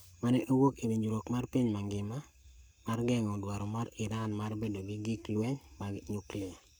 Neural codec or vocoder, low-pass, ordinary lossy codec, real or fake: codec, 44.1 kHz, 7.8 kbps, Pupu-Codec; none; none; fake